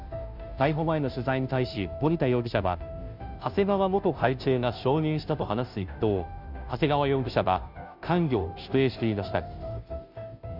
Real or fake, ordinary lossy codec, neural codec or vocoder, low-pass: fake; none; codec, 16 kHz, 0.5 kbps, FunCodec, trained on Chinese and English, 25 frames a second; 5.4 kHz